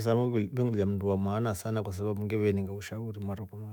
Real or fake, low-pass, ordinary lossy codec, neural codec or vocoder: fake; none; none; autoencoder, 48 kHz, 128 numbers a frame, DAC-VAE, trained on Japanese speech